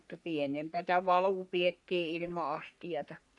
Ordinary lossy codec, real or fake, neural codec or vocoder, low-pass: none; fake; codec, 44.1 kHz, 3.4 kbps, Pupu-Codec; 10.8 kHz